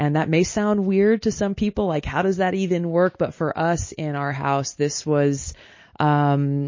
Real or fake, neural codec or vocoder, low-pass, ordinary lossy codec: real; none; 7.2 kHz; MP3, 32 kbps